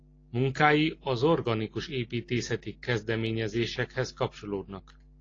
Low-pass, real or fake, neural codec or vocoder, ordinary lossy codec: 7.2 kHz; real; none; AAC, 32 kbps